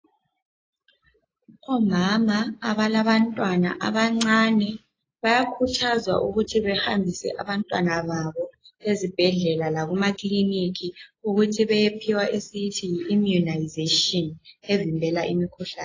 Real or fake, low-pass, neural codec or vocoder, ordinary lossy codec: real; 7.2 kHz; none; AAC, 32 kbps